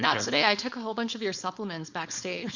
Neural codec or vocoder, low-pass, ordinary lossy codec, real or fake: codec, 16 kHz, 4.8 kbps, FACodec; 7.2 kHz; Opus, 64 kbps; fake